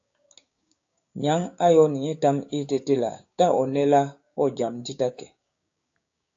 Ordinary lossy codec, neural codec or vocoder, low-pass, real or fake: AAC, 48 kbps; codec, 16 kHz, 6 kbps, DAC; 7.2 kHz; fake